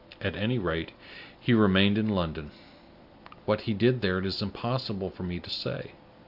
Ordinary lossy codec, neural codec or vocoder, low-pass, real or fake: AAC, 48 kbps; none; 5.4 kHz; real